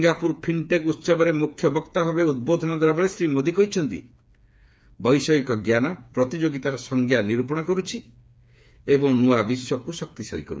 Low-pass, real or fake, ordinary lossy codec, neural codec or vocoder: none; fake; none; codec, 16 kHz, 4 kbps, FreqCodec, smaller model